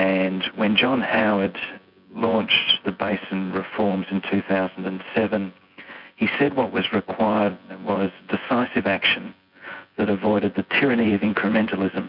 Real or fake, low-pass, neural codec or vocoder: fake; 5.4 kHz; vocoder, 24 kHz, 100 mel bands, Vocos